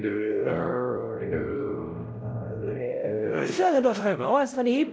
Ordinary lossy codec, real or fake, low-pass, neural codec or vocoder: none; fake; none; codec, 16 kHz, 0.5 kbps, X-Codec, WavLM features, trained on Multilingual LibriSpeech